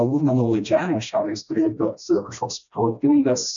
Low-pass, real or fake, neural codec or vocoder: 7.2 kHz; fake; codec, 16 kHz, 1 kbps, FreqCodec, smaller model